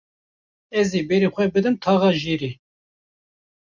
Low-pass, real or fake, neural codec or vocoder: 7.2 kHz; real; none